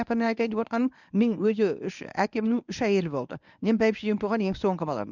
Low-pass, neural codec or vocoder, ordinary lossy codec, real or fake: 7.2 kHz; codec, 24 kHz, 0.9 kbps, WavTokenizer, medium speech release version 1; none; fake